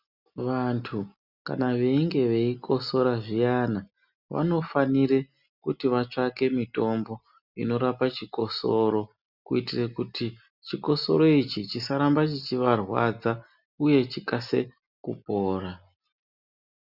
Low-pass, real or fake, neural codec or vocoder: 5.4 kHz; real; none